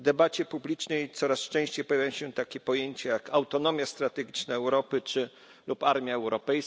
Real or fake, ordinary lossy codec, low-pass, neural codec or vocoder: real; none; none; none